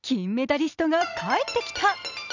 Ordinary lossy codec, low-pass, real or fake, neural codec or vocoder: none; 7.2 kHz; fake; vocoder, 44.1 kHz, 128 mel bands every 256 samples, BigVGAN v2